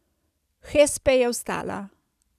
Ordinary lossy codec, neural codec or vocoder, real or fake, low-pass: none; none; real; 14.4 kHz